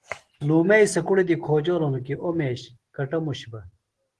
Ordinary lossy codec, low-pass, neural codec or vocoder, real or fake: Opus, 16 kbps; 10.8 kHz; none; real